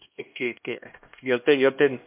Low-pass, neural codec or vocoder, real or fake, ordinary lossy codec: 3.6 kHz; codec, 16 kHz, 1 kbps, X-Codec, HuBERT features, trained on LibriSpeech; fake; MP3, 32 kbps